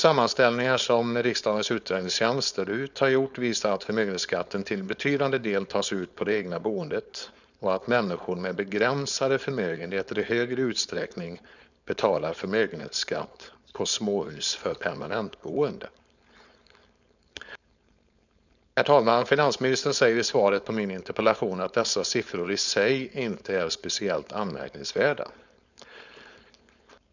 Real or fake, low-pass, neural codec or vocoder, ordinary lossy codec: fake; 7.2 kHz; codec, 16 kHz, 4.8 kbps, FACodec; none